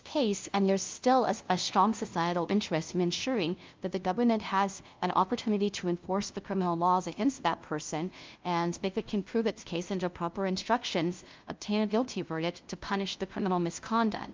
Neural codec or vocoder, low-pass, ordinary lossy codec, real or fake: codec, 16 kHz, 0.5 kbps, FunCodec, trained on LibriTTS, 25 frames a second; 7.2 kHz; Opus, 32 kbps; fake